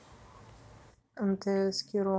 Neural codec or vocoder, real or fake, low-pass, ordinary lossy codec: none; real; none; none